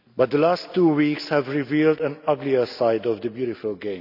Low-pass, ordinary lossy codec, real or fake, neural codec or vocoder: 5.4 kHz; none; real; none